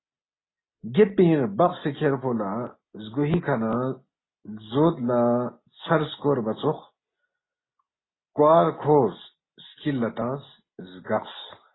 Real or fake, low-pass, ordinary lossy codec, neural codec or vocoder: real; 7.2 kHz; AAC, 16 kbps; none